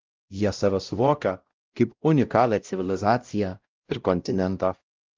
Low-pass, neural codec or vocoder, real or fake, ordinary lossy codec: 7.2 kHz; codec, 16 kHz, 0.5 kbps, X-Codec, WavLM features, trained on Multilingual LibriSpeech; fake; Opus, 24 kbps